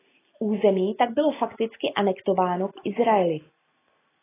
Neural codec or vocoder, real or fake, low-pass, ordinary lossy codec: none; real; 3.6 kHz; AAC, 16 kbps